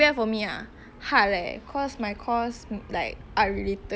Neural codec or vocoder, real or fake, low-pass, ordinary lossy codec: none; real; none; none